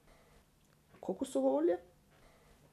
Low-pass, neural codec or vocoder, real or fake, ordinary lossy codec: 14.4 kHz; none; real; none